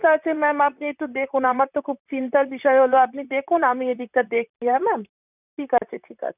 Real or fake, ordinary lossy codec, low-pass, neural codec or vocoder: fake; none; 3.6 kHz; vocoder, 44.1 kHz, 128 mel bands, Pupu-Vocoder